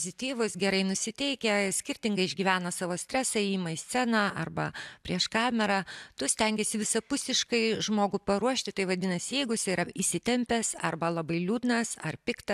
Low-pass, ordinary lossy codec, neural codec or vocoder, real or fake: 14.4 kHz; AAC, 96 kbps; none; real